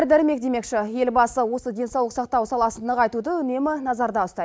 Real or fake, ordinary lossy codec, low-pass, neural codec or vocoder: real; none; none; none